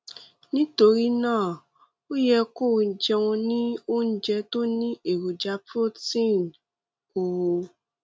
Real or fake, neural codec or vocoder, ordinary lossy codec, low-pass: real; none; none; none